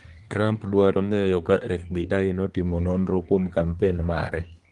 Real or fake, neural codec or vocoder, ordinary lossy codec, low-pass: fake; codec, 24 kHz, 1 kbps, SNAC; Opus, 24 kbps; 10.8 kHz